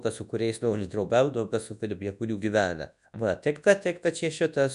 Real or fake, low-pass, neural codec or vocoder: fake; 10.8 kHz; codec, 24 kHz, 0.9 kbps, WavTokenizer, large speech release